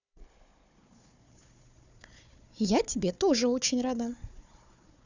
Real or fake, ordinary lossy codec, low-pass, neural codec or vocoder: fake; none; 7.2 kHz; codec, 16 kHz, 4 kbps, FunCodec, trained on Chinese and English, 50 frames a second